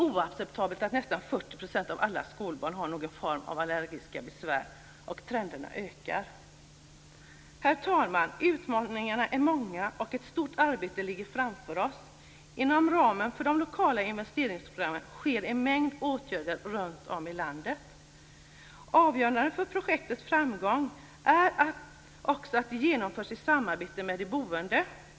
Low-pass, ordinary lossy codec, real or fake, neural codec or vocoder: none; none; real; none